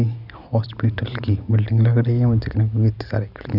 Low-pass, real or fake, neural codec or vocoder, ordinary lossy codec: 5.4 kHz; real; none; none